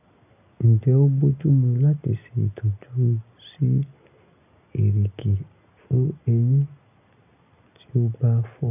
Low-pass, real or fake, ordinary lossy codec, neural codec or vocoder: 3.6 kHz; real; none; none